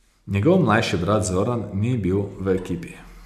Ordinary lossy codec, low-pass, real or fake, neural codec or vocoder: none; 14.4 kHz; real; none